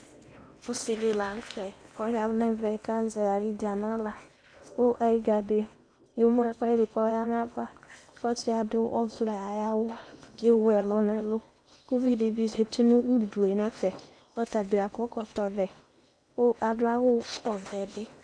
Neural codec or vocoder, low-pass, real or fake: codec, 16 kHz in and 24 kHz out, 0.8 kbps, FocalCodec, streaming, 65536 codes; 9.9 kHz; fake